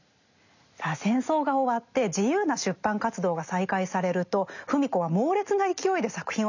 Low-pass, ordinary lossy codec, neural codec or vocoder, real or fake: 7.2 kHz; none; none; real